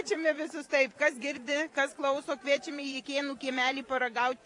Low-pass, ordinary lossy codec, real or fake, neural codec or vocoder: 10.8 kHz; AAC, 48 kbps; fake; vocoder, 24 kHz, 100 mel bands, Vocos